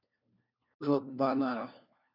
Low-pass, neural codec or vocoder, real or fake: 5.4 kHz; codec, 16 kHz, 1 kbps, FunCodec, trained on LibriTTS, 50 frames a second; fake